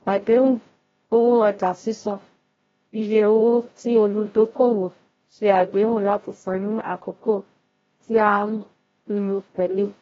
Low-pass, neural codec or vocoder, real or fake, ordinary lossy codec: 7.2 kHz; codec, 16 kHz, 0.5 kbps, FreqCodec, larger model; fake; AAC, 24 kbps